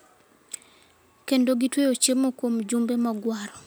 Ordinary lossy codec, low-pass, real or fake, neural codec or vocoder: none; none; real; none